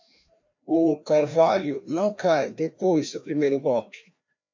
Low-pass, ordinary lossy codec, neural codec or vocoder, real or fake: 7.2 kHz; MP3, 64 kbps; codec, 16 kHz, 1 kbps, FreqCodec, larger model; fake